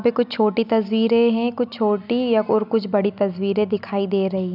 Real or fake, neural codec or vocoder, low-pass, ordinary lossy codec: real; none; 5.4 kHz; none